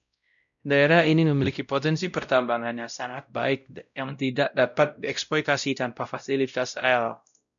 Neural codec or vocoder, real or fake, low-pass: codec, 16 kHz, 0.5 kbps, X-Codec, WavLM features, trained on Multilingual LibriSpeech; fake; 7.2 kHz